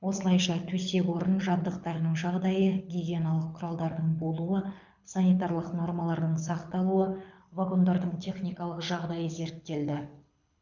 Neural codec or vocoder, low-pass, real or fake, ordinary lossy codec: codec, 24 kHz, 6 kbps, HILCodec; 7.2 kHz; fake; none